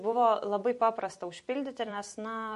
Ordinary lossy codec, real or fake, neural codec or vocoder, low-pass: MP3, 48 kbps; fake; vocoder, 44.1 kHz, 128 mel bands every 256 samples, BigVGAN v2; 14.4 kHz